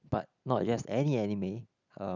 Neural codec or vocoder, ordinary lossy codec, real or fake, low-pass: none; none; real; 7.2 kHz